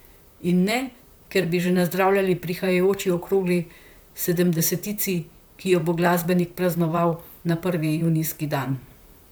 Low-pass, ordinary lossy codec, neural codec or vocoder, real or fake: none; none; vocoder, 44.1 kHz, 128 mel bands, Pupu-Vocoder; fake